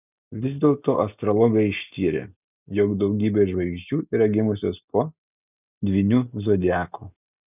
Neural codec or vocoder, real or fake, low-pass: none; real; 3.6 kHz